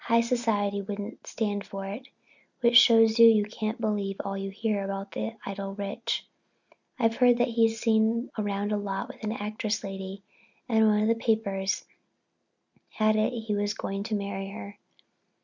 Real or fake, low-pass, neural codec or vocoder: real; 7.2 kHz; none